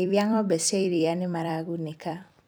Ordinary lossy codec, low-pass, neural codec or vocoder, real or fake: none; none; vocoder, 44.1 kHz, 128 mel bands every 256 samples, BigVGAN v2; fake